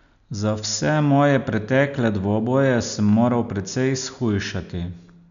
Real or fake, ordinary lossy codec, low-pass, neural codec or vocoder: real; MP3, 96 kbps; 7.2 kHz; none